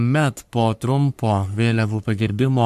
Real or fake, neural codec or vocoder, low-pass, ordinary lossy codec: fake; codec, 44.1 kHz, 3.4 kbps, Pupu-Codec; 14.4 kHz; MP3, 96 kbps